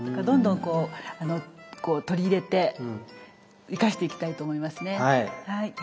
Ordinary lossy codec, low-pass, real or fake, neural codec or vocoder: none; none; real; none